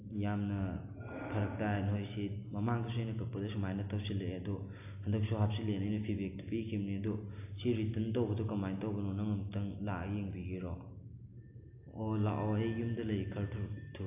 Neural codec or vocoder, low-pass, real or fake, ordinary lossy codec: none; 3.6 kHz; real; AAC, 24 kbps